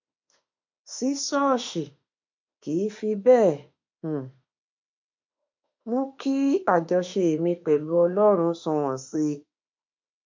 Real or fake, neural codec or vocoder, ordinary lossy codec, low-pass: fake; autoencoder, 48 kHz, 32 numbers a frame, DAC-VAE, trained on Japanese speech; MP3, 48 kbps; 7.2 kHz